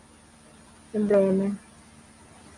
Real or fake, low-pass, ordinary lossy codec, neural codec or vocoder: real; 10.8 kHz; AAC, 64 kbps; none